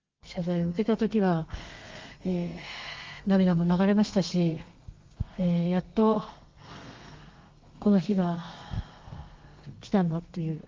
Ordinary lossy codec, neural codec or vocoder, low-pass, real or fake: Opus, 32 kbps; codec, 32 kHz, 1.9 kbps, SNAC; 7.2 kHz; fake